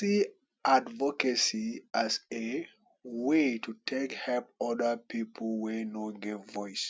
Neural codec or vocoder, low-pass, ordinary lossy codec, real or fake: none; none; none; real